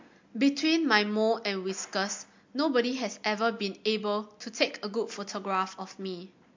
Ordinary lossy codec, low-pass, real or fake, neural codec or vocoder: MP3, 48 kbps; 7.2 kHz; real; none